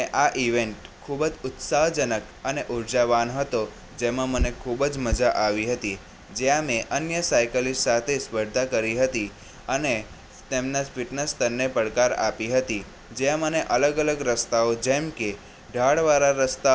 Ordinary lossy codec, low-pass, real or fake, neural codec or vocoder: none; none; real; none